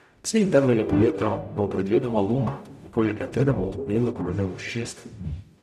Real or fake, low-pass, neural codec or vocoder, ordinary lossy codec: fake; 14.4 kHz; codec, 44.1 kHz, 0.9 kbps, DAC; none